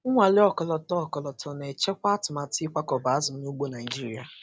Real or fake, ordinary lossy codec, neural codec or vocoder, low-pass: real; none; none; none